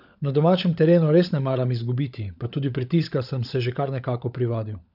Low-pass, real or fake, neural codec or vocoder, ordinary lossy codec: 5.4 kHz; fake; codec, 16 kHz, 16 kbps, FunCodec, trained on LibriTTS, 50 frames a second; none